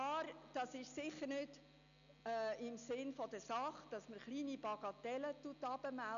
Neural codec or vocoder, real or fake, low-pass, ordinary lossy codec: none; real; 7.2 kHz; none